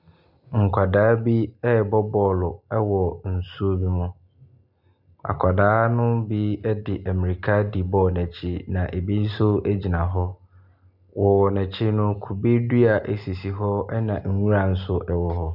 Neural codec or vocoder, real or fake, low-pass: none; real; 5.4 kHz